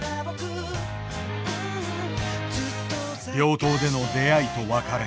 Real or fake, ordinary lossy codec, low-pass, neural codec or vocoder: real; none; none; none